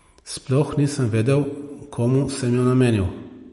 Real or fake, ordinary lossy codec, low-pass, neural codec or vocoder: real; MP3, 48 kbps; 19.8 kHz; none